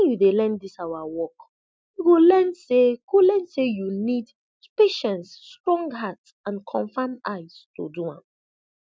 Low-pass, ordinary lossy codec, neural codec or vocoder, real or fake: none; none; none; real